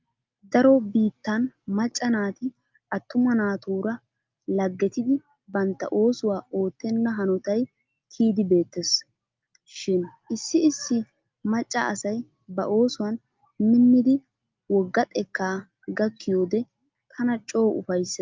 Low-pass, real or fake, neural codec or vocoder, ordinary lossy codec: 7.2 kHz; real; none; Opus, 24 kbps